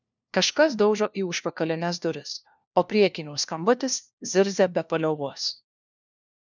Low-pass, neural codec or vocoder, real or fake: 7.2 kHz; codec, 16 kHz, 1 kbps, FunCodec, trained on LibriTTS, 50 frames a second; fake